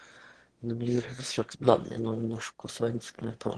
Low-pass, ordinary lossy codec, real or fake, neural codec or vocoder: 9.9 kHz; Opus, 16 kbps; fake; autoencoder, 22.05 kHz, a latent of 192 numbers a frame, VITS, trained on one speaker